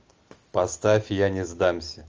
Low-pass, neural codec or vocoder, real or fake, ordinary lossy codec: 7.2 kHz; none; real; Opus, 24 kbps